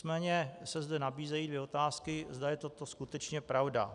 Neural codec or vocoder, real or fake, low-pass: none; real; 10.8 kHz